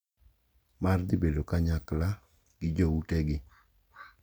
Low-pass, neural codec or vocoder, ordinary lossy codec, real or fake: none; none; none; real